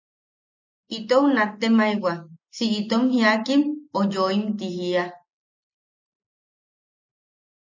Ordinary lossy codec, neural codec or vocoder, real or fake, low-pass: MP3, 64 kbps; none; real; 7.2 kHz